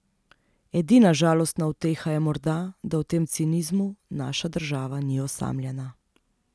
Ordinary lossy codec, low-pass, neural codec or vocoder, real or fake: none; none; none; real